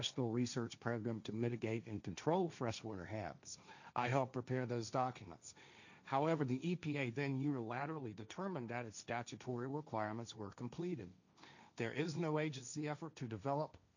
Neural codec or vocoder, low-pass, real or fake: codec, 16 kHz, 1.1 kbps, Voila-Tokenizer; 7.2 kHz; fake